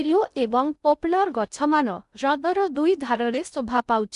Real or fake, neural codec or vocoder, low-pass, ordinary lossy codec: fake; codec, 16 kHz in and 24 kHz out, 0.6 kbps, FocalCodec, streaming, 2048 codes; 10.8 kHz; none